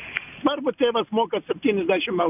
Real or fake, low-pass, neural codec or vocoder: real; 3.6 kHz; none